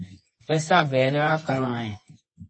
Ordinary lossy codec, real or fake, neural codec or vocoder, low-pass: MP3, 32 kbps; fake; codec, 24 kHz, 0.9 kbps, WavTokenizer, medium music audio release; 9.9 kHz